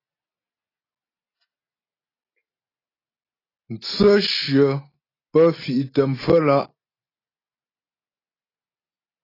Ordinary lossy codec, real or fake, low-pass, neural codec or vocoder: AAC, 24 kbps; real; 5.4 kHz; none